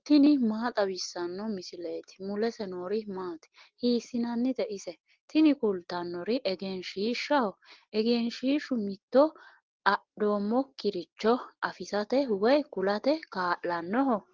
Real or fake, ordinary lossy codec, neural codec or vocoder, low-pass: real; Opus, 16 kbps; none; 7.2 kHz